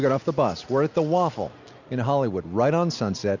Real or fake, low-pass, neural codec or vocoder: real; 7.2 kHz; none